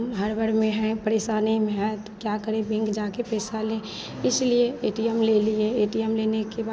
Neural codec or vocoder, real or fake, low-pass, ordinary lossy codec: none; real; none; none